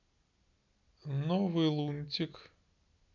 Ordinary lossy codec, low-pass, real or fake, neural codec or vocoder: none; 7.2 kHz; real; none